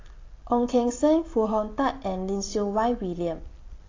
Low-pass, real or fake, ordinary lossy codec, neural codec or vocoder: 7.2 kHz; real; AAC, 32 kbps; none